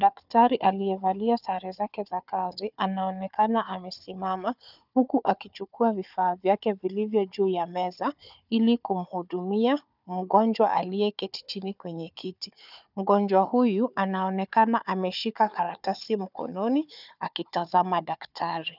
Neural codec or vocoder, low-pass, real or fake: codec, 16 kHz, 4 kbps, FunCodec, trained on Chinese and English, 50 frames a second; 5.4 kHz; fake